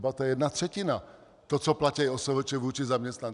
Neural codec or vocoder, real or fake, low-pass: none; real; 10.8 kHz